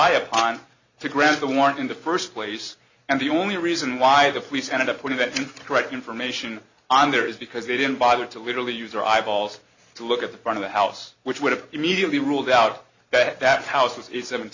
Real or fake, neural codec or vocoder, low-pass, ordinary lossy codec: real; none; 7.2 kHz; Opus, 64 kbps